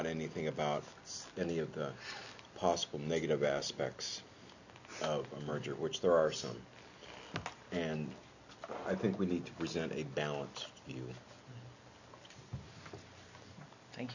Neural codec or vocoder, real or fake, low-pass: none; real; 7.2 kHz